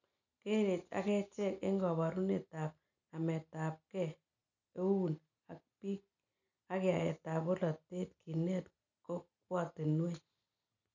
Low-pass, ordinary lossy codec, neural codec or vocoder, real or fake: 7.2 kHz; none; none; real